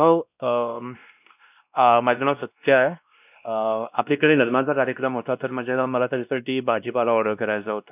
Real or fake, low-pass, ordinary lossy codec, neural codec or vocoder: fake; 3.6 kHz; none; codec, 16 kHz, 1 kbps, X-Codec, WavLM features, trained on Multilingual LibriSpeech